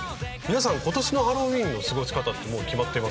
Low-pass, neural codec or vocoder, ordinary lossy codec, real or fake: none; none; none; real